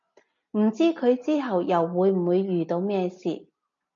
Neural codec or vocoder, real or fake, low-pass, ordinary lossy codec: none; real; 7.2 kHz; MP3, 48 kbps